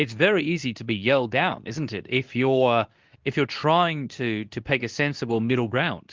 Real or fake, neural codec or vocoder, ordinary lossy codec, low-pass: fake; codec, 24 kHz, 0.9 kbps, WavTokenizer, medium speech release version 2; Opus, 24 kbps; 7.2 kHz